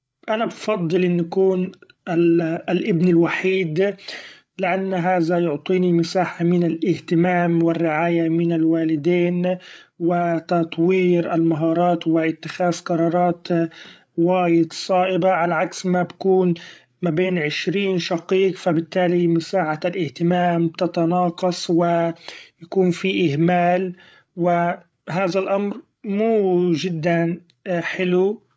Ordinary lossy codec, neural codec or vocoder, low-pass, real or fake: none; codec, 16 kHz, 8 kbps, FreqCodec, larger model; none; fake